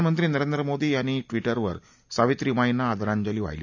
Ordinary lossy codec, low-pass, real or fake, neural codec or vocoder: none; 7.2 kHz; real; none